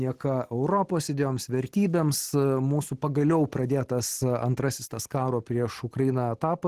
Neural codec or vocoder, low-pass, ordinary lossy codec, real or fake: none; 14.4 kHz; Opus, 16 kbps; real